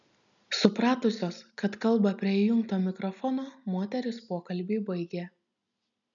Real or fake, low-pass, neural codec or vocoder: real; 7.2 kHz; none